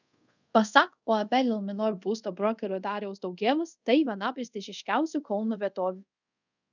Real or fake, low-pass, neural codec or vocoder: fake; 7.2 kHz; codec, 24 kHz, 0.5 kbps, DualCodec